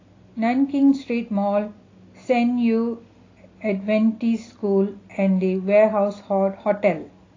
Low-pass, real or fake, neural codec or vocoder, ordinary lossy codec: 7.2 kHz; real; none; AAC, 32 kbps